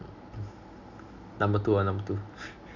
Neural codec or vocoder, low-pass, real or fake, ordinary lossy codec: none; 7.2 kHz; real; none